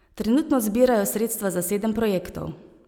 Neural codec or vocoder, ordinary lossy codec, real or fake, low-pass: none; none; real; none